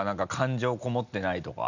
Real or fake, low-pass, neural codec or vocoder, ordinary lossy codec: real; 7.2 kHz; none; none